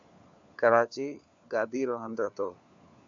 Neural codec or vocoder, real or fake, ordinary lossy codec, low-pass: codec, 16 kHz, 4 kbps, FunCodec, trained on LibriTTS, 50 frames a second; fake; AAC, 64 kbps; 7.2 kHz